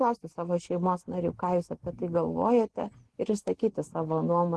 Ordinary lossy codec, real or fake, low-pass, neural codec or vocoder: Opus, 16 kbps; real; 10.8 kHz; none